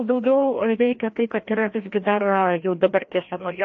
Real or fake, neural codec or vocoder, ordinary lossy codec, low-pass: fake; codec, 16 kHz, 1 kbps, FreqCodec, larger model; AAC, 48 kbps; 7.2 kHz